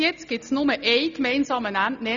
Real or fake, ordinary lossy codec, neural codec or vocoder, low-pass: real; none; none; 7.2 kHz